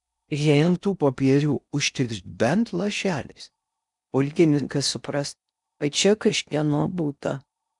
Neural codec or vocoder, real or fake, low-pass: codec, 16 kHz in and 24 kHz out, 0.6 kbps, FocalCodec, streaming, 4096 codes; fake; 10.8 kHz